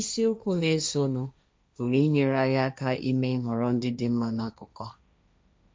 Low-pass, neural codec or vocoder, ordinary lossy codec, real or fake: none; codec, 16 kHz, 1.1 kbps, Voila-Tokenizer; none; fake